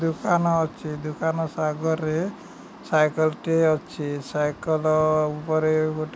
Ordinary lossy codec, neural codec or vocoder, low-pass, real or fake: none; none; none; real